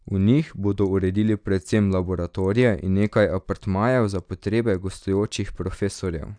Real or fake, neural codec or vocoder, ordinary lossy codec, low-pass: real; none; none; 9.9 kHz